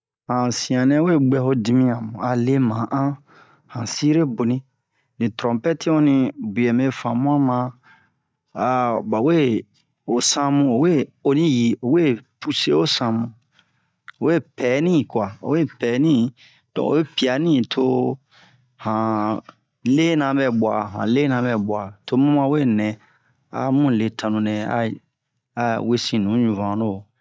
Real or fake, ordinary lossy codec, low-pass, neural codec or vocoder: real; none; none; none